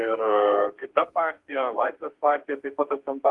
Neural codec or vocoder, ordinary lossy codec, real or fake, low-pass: codec, 32 kHz, 1.9 kbps, SNAC; Opus, 24 kbps; fake; 10.8 kHz